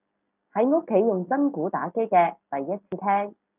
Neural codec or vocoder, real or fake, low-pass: none; real; 3.6 kHz